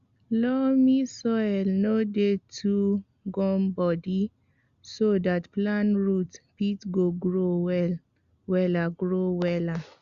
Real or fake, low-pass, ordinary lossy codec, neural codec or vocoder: real; 7.2 kHz; Opus, 64 kbps; none